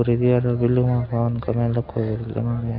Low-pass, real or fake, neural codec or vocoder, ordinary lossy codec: 5.4 kHz; real; none; AAC, 32 kbps